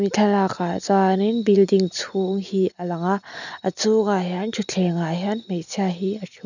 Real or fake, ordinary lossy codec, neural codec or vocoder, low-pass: real; none; none; 7.2 kHz